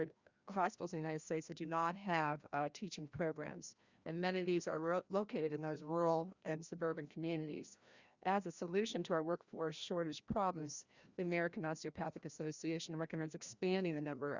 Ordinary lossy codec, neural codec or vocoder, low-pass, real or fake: Opus, 64 kbps; codec, 16 kHz, 1 kbps, FreqCodec, larger model; 7.2 kHz; fake